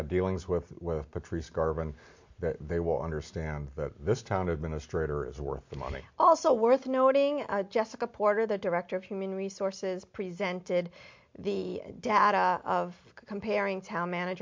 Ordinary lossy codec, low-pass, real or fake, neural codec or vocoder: MP3, 48 kbps; 7.2 kHz; real; none